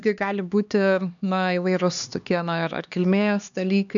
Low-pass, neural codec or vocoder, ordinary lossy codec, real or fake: 7.2 kHz; codec, 16 kHz, 2 kbps, X-Codec, HuBERT features, trained on LibriSpeech; MP3, 96 kbps; fake